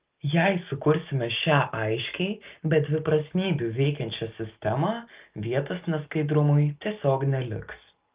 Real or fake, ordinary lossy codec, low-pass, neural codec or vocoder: real; Opus, 32 kbps; 3.6 kHz; none